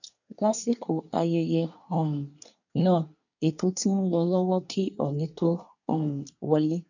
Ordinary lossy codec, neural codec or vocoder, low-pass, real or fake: none; codec, 24 kHz, 1 kbps, SNAC; 7.2 kHz; fake